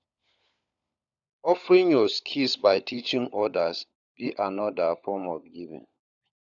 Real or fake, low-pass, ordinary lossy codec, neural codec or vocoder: fake; 7.2 kHz; none; codec, 16 kHz, 16 kbps, FunCodec, trained on LibriTTS, 50 frames a second